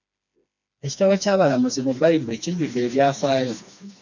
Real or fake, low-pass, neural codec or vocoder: fake; 7.2 kHz; codec, 16 kHz, 2 kbps, FreqCodec, smaller model